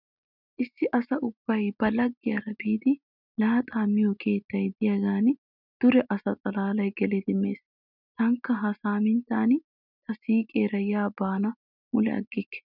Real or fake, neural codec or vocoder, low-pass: real; none; 5.4 kHz